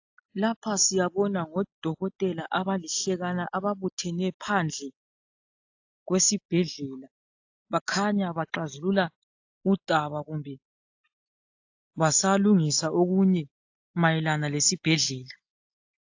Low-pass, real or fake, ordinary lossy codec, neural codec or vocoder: 7.2 kHz; real; AAC, 48 kbps; none